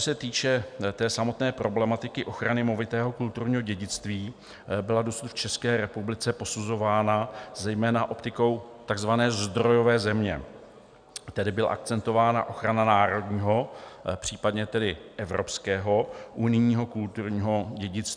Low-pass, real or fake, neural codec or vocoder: 9.9 kHz; real; none